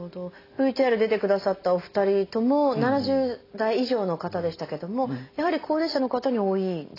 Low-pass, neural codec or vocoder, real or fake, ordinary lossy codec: 5.4 kHz; none; real; AAC, 24 kbps